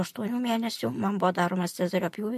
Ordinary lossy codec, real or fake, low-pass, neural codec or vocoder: MP3, 64 kbps; real; 14.4 kHz; none